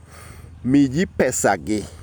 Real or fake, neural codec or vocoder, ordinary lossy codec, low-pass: real; none; none; none